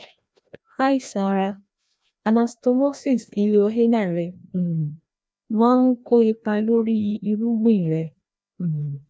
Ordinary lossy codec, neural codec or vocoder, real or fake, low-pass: none; codec, 16 kHz, 1 kbps, FreqCodec, larger model; fake; none